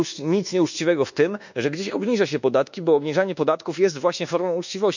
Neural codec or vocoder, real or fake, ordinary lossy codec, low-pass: codec, 24 kHz, 1.2 kbps, DualCodec; fake; none; 7.2 kHz